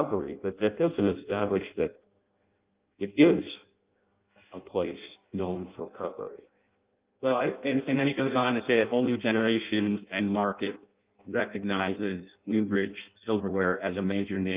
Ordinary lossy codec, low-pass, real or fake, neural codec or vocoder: Opus, 24 kbps; 3.6 kHz; fake; codec, 16 kHz in and 24 kHz out, 0.6 kbps, FireRedTTS-2 codec